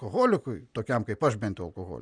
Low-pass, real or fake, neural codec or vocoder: 9.9 kHz; real; none